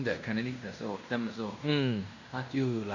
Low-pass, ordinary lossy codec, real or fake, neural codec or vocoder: 7.2 kHz; none; fake; codec, 16 kHz in and 24 kHz out, 0.9 kbps, LongCat-Audio-Codec, fine tuned four codebook decoder